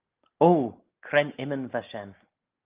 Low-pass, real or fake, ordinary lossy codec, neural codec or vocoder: 3.6 kHz; real; Opus, 24 kbps; none